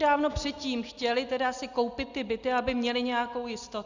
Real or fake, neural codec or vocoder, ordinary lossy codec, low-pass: real; none; Opus, 64 kbps; 7.2 kHz